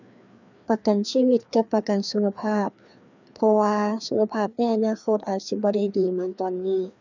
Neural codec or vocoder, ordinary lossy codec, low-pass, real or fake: codec, 16 kHz, 2 kbps, FreqCodec, larger model; none; 7.2 kHz; fake